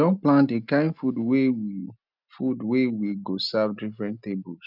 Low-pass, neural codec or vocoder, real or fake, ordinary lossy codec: 5.4 kHz; none; real; none